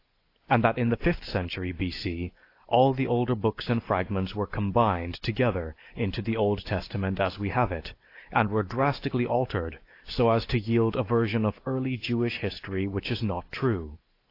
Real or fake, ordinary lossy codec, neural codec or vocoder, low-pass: real; AAC, 32 kbps; none; 5.4 kHz